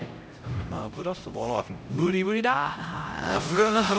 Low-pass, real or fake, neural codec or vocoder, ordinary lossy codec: none; fake; codec, 16 kHz, 0.5 kbps, X-Codec, HuBERT features, trained on LibriSpeech; none